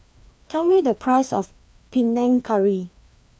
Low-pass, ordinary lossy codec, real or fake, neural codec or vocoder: none; none; fake; codec, 16 kHz, 2 kbps, FreqCodec, larger model